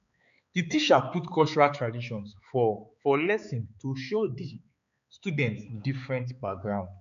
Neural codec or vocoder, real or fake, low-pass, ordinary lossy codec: codec, 16 kHz, 4 kbps, X-Codec, HuBERT features, trained on balanced general audio; fake; 7.2 kHz; none